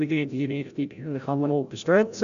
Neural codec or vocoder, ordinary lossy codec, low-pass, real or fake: codec, 16 kHz, 0.5 kbps, FreqCodec, larger model; none; 7.2 kHz; fake